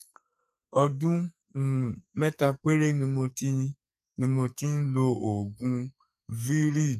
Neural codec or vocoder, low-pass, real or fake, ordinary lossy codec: codec, 44.1 kHz, 2.6 kbps, SNAC; 14.4 kHz; fake; none